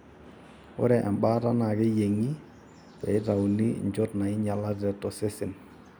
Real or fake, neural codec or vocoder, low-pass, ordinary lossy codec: real; none; none; none